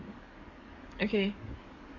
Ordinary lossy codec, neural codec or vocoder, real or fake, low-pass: Opus, 64 kbps; codec, 44.1 kHz, 7.8 kbps, DAC; fake; 7.2 kHz